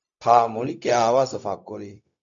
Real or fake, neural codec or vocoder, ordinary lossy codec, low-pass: fake; codec, 16 kHz, 0.4 kbps, LongCat-Audio-Codec; AAC, 64 kbps; 7.2 kHz